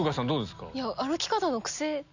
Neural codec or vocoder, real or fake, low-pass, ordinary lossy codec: none; real; 7.2 kHz; none